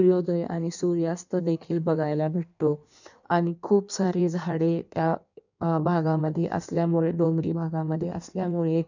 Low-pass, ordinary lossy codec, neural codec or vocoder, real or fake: 7.2 kHz; none; codec, 16 kHz in and 24 kHz out, 1.1 kbps, FireRedTTS-2 codec; fake